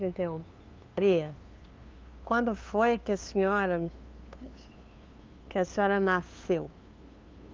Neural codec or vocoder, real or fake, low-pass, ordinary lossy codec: codec, 16 kHz, 2 kbps, FunCodec, trained on LibriTTS, 25 frames a second; fake; 7.2 kHz; Opus, 24 kbps